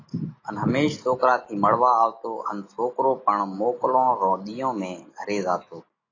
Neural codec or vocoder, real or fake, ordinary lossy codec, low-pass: none; real; AAC, 32 kbps; 7.2 kHz